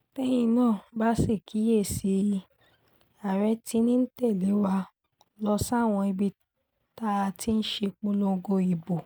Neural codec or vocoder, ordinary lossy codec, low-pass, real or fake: none; none; none; real